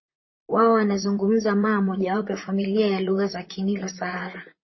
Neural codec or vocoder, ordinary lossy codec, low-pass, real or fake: vocoder, 44.1 kHz, 128 mel bands, Pupu-Vocoder; MP3, 24 kbps; 7.2 kHz; fake